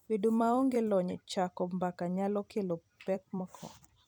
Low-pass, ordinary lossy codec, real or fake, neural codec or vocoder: none; none; fake; vocoder, 44.1 kHz, 128 mel bands every 256 samples, BigVGAN v2